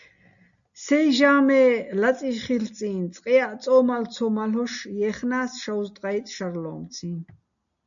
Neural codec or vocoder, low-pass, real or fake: none; 7.2 kHz; real